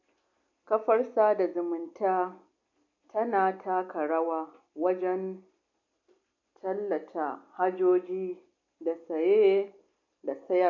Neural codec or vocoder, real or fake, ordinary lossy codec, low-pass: none; real; MP3, 48 kbps; 7.2 kHz